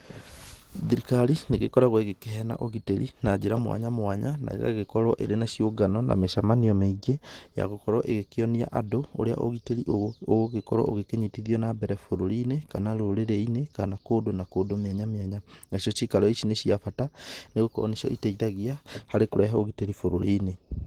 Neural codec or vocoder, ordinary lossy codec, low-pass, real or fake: none; Opus, 16 kbps; 19.8 kHz; real